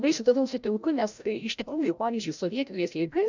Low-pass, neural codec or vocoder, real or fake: 7.2 kHz; codec, 16 kHz, 0.5 kbps, FreqCodec, larger model; fake